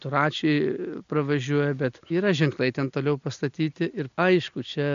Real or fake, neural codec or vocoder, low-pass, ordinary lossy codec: real; none; 7.2 kHz; AAC, 96 kbps